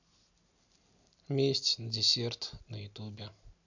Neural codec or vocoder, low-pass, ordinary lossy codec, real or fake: none; 7.2 kHz; none; real